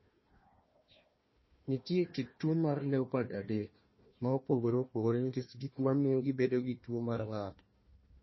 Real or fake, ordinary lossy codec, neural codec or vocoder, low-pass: fake; MP3, 24 kbps; codec, 16 kHz, 1 kbps, FunCodec, trained on Chinese and English, 50 frames a second; 7.2 kHz